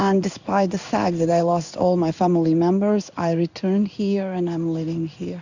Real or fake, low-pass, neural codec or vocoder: fake; 7.2 kHz; codec, 16 kHz in and 24 kHz out, 1 kbps, XY-Tokenizer